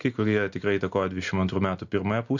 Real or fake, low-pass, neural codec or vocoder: real; 7.2 kHz; none